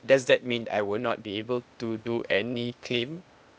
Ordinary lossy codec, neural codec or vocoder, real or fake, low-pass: none; codec, 16 kHz, 0.8 kbps, ZipCodec; fake; none